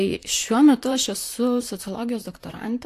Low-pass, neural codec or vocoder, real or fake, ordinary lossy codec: 14.4 kHz; vocoder, 44.1 kHz, 128 mel bands, Pupu-Vocoder; fake; AAC, 64 kbps